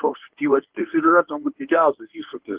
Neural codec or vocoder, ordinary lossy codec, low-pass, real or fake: codec, 24 kHz, 0.9 kbps, WavTokenizer, medium speech release version 1; Opus, 16 kbps; 3.6 kHz; fake